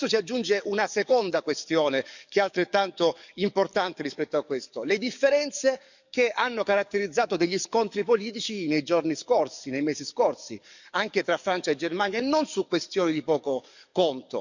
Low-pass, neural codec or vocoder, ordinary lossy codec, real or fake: 7.2 kHz; codec, 44.1 kHz, 7.8 kbps, DAC; none; fake